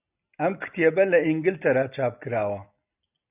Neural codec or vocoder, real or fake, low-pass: none; real; 3.6 kHz